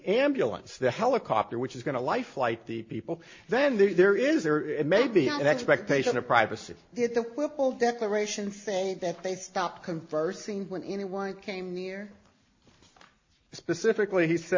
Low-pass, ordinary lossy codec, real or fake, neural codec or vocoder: 7.2 kHz; MP3, 32 kbps; real; none